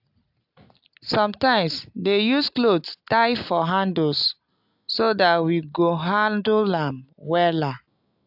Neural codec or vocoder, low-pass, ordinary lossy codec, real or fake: none; 5.4 kHz; AAC, 48 kbps; real